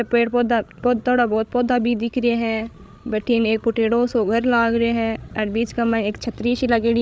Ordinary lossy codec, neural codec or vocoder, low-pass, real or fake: none; codec, 16 kHz, 16 kbps, FreqCodec, larger model; none; fake